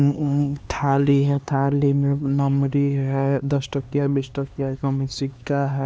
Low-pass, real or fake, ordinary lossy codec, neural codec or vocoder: none; fake; none; codec, 16 kHz, 2 kbps, X-Codec, HuBERT features, trained on LibriSpeech